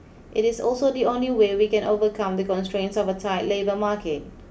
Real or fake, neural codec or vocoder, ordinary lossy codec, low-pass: real; none; none; none